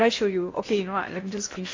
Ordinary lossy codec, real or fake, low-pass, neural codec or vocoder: AAC, 32 kbps; fake; 7.2 kHz; codec, 16 kHz in and 24 kHz out, 0.8 kbps, FocalCodec, streaming, 65536 codes